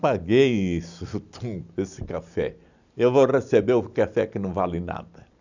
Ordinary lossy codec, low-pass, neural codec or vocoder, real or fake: none; 7.2 kHz; none; real